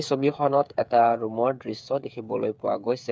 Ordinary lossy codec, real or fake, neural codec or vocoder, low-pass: none; fake; codec, 16 kHz, 8 kbps, FreqCodec, smaller model; none